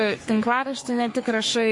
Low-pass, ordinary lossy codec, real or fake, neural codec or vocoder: 10.8 kHz; MP3, 48 kbps; fake; codec, 44.1 kHz, 3.4 kbps, Pupu-Codec